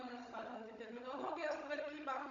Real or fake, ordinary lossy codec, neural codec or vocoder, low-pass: fake; MP3, 96 kbps; codec, 16 kHz, 16 kbps, FunCodec, trained on Chinese and English, 50 frames a second; 7.2 kHz